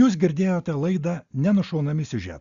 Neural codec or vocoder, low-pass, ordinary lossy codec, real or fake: none; 7.2 kHz; Opus, 64 kbps; real